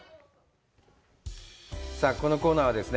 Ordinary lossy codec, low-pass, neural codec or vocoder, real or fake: none; none; none; real